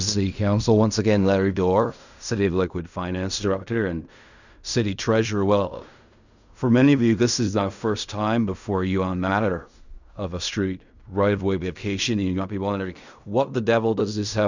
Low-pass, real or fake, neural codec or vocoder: 7.2 kHz; fake; codec, 16 kHz in and 24 kHz out, 0.4 kbps, LongCat-Audio-Codec, fine tuned four codebook decoder